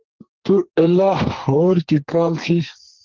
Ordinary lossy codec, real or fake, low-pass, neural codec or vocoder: Opus, 16 kbps; fake; 7.2 kHz; codec, 32 kHz, 1.9 kbps, SNAC